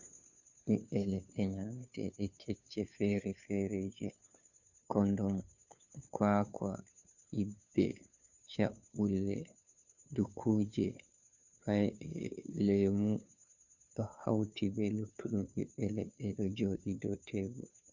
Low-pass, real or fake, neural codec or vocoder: 7.2 kHz; fake; codec, 16 kHz, 2 kbps, FunCodec, trained on Chinese and English, 25 frames a second